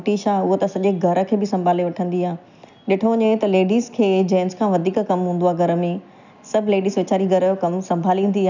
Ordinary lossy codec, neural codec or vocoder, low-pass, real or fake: none; none; 7.2 kHz; real